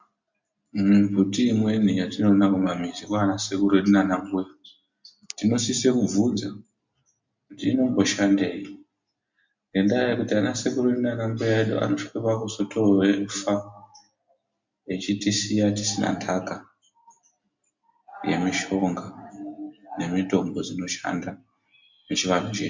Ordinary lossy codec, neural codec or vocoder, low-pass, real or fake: MP3, 64 kbps; none; 7.2 kHz; real